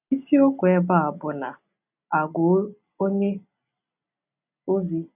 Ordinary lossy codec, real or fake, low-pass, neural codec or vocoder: none; real; 3.6 kHz; none